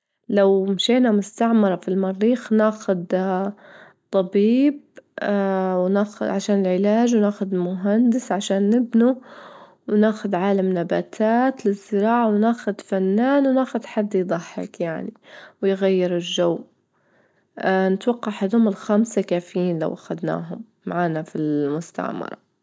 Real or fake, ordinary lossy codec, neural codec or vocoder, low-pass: real; none; none; none